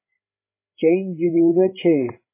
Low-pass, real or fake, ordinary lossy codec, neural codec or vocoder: 3.6 kHz; fake; MP3, 16 kbps; codec, 16 kHz, 16 kbps, FreqCodec, larger model